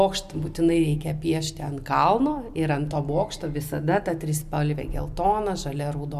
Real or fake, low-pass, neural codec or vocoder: real; 14.4 kHz; none